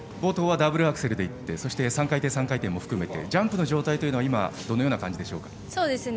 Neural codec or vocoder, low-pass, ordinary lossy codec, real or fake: none; none; none; real